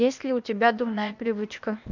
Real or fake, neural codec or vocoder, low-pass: fake; codec, 16 kHz, 0.8 kbps, ZipCodec; 7.2 kHz